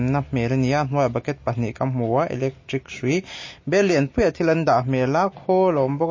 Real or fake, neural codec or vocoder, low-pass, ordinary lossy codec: real; none; 7.2 kHz; MP3, 32 kbps